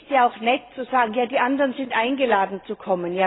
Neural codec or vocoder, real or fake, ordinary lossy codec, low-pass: none; real; AAC, 16 kbps; 7.2 kHz